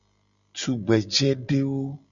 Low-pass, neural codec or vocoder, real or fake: 7.2 kHz; none; real